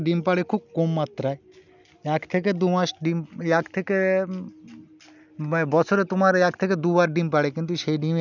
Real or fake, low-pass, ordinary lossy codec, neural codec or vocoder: real; 7.2 kHz; none; none